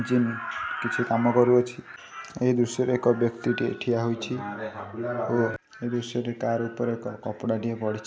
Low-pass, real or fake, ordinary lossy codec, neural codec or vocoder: none; real; none; none